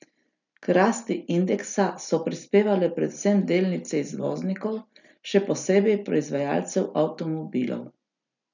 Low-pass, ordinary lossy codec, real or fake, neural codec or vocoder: 7.2 kHz; none; real; none